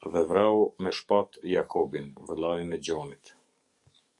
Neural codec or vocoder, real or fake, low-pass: codec, 44.1 kHz, 7.8 kbps, DAC; fake; 10.8 kHz